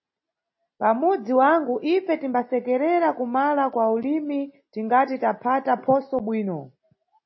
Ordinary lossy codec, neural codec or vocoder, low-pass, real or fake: MP3, 24 kbps; none; 7.2 kHz; real